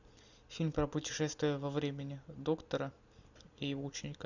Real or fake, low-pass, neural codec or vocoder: real; 7.2 kHz; none